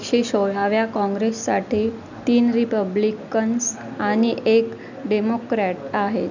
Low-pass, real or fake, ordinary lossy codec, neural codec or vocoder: 7.2 kHz; real; none; none